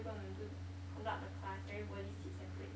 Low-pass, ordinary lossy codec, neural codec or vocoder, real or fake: none; none; none; real